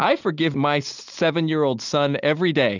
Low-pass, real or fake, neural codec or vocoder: 7.2 kHz; real; none